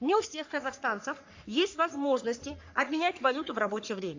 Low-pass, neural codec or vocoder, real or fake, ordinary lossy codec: 7.2 kHz; codec, 44.1 kHz, 3.4 kbps, Pupu-Codec; fake; AAC, 48 kbps